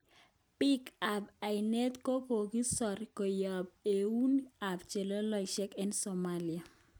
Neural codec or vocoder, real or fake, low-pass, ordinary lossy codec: none; real; none; none